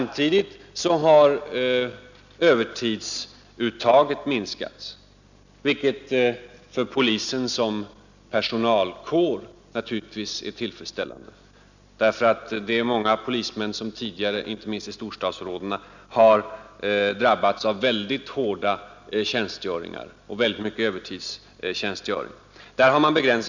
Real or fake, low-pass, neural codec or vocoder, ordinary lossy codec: real; 7.2 kHz; none; none